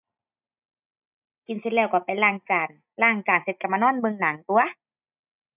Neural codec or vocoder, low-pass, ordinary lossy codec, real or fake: none; 3.6 kHz; none; real